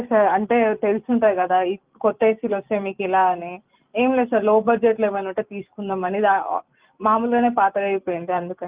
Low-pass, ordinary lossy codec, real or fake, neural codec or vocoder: 3.6 kHz; Opus, 32 kbps; real; none